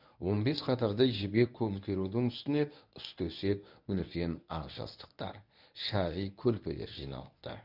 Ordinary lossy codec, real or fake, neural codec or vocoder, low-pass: none; fake; codec, 24 kHz, 0.9 kbps, WavTokenizer, medium speech release version 1; 5.4 kHz